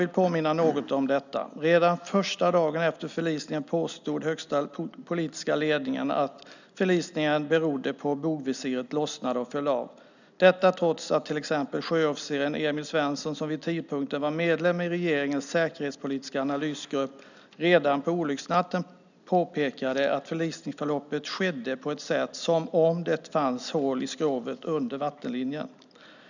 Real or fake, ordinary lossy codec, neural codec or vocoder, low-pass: real; none; none; 7.2 kHz